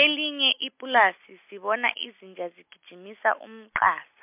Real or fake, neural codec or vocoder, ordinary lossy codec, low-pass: real; none; MP3, 32 kbps; 3.6 kHz